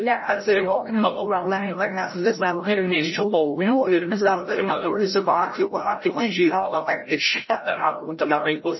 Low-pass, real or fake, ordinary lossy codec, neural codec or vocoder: 7.2 kHz; fake; MP3, 24 kbps; codec, 16 kHz, 0.5 kbps, FreqCodec, larger model